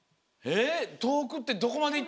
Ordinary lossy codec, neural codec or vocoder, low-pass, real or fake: none; none; none; real